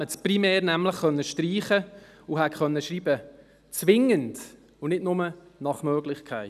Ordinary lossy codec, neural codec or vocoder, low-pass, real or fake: none; none; 14.4 kHz; real